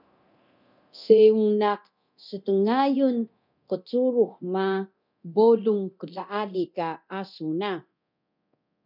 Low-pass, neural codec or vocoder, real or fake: 5.4 kHz; codec, 24 kHz, 0.9 kbps, DualCodec; fake